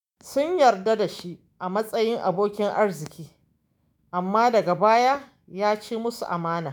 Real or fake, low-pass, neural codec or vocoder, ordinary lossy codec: fake; none; autoencoder, 48 kHz, 128 numbers a frame, DAC-VAE, trained on Japanese speech; none